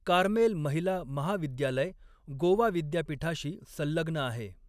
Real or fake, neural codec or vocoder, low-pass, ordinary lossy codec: real; none; 14.4 kHz; none